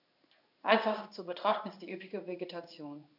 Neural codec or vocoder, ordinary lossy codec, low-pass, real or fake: codec, 16 kHz in and 24 kHz out, 1 kbps, XY-Tokenizer; none; 5.4 kHz; fake